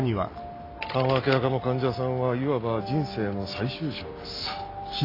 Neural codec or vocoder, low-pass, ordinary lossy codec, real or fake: none; 5.4 kHz; AAC, 24 kbps; real